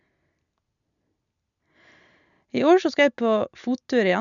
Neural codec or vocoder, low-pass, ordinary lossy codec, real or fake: none; 7.2 kHz; none; real